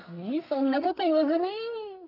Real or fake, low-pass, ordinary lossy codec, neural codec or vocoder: fake; 5.4 kHz; none; codec, 32 kHz, 1.9 kbps, SNAC